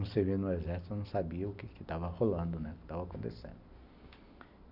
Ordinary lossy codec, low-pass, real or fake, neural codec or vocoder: none; 5.4 kHz; real; none